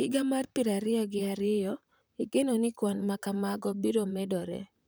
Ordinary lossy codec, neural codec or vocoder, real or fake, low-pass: none; vocoder, 44.1 kHz, 128 mel bands, Pupu-Vocoder; fake; none